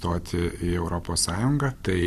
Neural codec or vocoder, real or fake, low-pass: none; real; 14.4 kHz